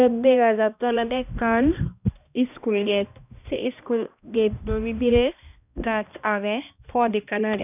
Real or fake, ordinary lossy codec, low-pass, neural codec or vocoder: fake; none; 3.6 kHz; codec, 16 kHz, 1 kbps, X-Codec, HuBERT features, trained on balanced general audio